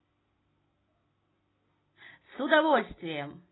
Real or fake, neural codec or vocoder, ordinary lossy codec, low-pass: real; none; AAC, 16 kbps; 7.2 kHz